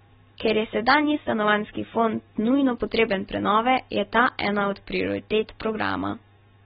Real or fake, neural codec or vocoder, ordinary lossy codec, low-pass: real; none; AAC, 16 kbps; 7.2 kHz